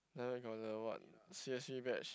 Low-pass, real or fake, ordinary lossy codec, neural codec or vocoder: none; real; none; none